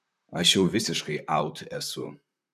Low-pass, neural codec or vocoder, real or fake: 14.4 kHz; vocoder, 44.1 kHz, 128 mel bands, Pupu-Vocoder; fake